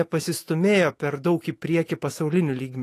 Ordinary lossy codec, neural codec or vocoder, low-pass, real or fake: AAC, 48 kbps; autoencoder, 48 kHz, 128 numbers a frame, DAC-VAE, trained on Japanese speech; 14.4 kHz; fake